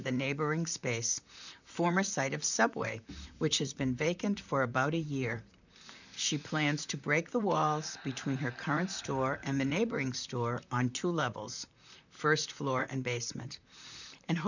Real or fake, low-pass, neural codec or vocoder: fake; 7.2 kHz; vocoder, 44.1 kHz, 128 mel bands, Pupu-Vocoder